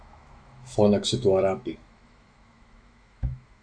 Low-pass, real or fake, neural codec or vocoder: 9.9 kHz; fake; autoencoder, 48 kHz, 128 numbers a frame, DAC-VAE, trained on Japanese speech